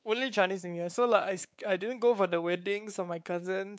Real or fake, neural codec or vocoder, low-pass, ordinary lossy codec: fake; codec, 16 kHz, 4 kbps, X-Codec, WavLM features, trained on Multilingual LibriSpeech; none; none